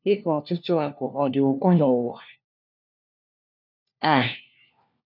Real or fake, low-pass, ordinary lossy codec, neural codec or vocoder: fake; 5.4 kHz; none; codec, 16 kHz, 1 kbps, FunCodec, trained on LibriTTS, 50 frames a second